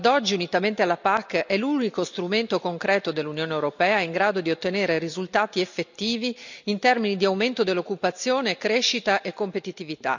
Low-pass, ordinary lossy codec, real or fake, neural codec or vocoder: 7.2 kHz; none; real; none